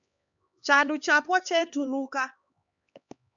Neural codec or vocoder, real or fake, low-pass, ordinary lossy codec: codec, 16 kHz, 2 kbps, X-Codec, HuBERT features, trained on LibriSpeech; fake; 7.2 kHz; MP3, 96 kbps